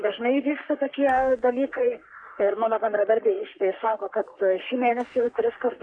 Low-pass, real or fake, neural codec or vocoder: 9.9 kHz; fake; codec, 44.1 kHz, 3.4 kbps, Pupu-Codec